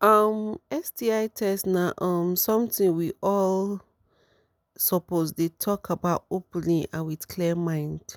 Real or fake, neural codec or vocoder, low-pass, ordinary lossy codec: real; none; none; none